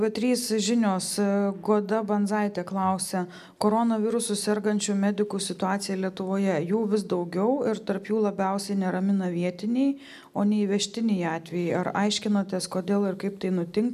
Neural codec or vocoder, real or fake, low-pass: none; real; 14.4 kHz